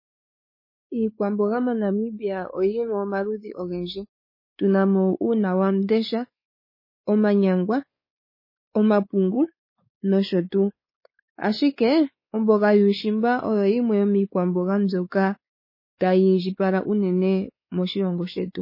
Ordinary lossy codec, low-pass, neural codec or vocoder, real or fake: MP3, 24 kbps; 5.4 kHz; codec, 16 kHz, 4 kbps, X-Codec, WavLM features, trained on Multilingual LibriSpeech; fake